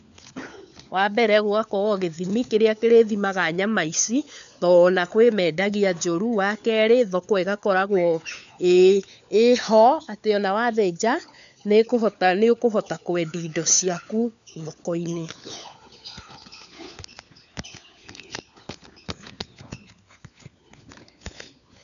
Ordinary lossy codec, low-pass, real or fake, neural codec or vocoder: none; 7.2 kHz; fake; codec, 16 kHz, 4 kbps, FunCodec, trained on LibriTTS, 50 frames a second